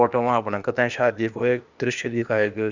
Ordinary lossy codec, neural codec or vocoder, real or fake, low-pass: Opus, 64 kbps; codec, 16 kHz, 0.8 kbps, ZipCodec; fake; 7.2 kHz